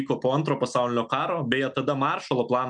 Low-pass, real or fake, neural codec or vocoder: 10.8 kHz; real; none